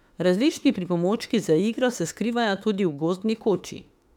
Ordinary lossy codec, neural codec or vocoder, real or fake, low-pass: none; autoencoder, 48 kHz, 32 numbers a frame, DAC-VAE, trained on Japanese speech; fake; 19.8 kHz